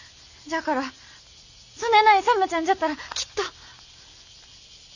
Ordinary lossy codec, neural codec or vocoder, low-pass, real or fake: none; none; 7.2 kHz; real